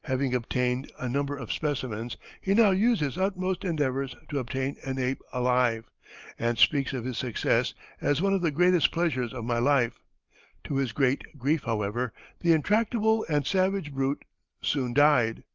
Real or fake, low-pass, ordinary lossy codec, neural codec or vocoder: real; 7.2 kHz; Opus, 32 kbps; none